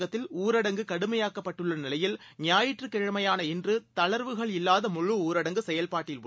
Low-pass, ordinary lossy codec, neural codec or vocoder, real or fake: none; none; none; real